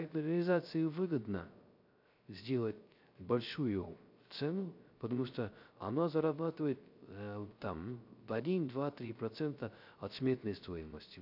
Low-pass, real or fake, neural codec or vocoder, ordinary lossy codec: 5.4 kHz; fake; codec, 16 kHz, 0.3 kbps, FocalCodec; none